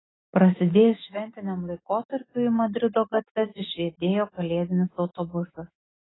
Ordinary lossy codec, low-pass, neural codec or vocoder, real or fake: AAC, 16 kbps; 7.2 kHz; autoencoder, 48 kHz, 128 numbers a frame, DAC-VAE, trained on Japanese speech; fake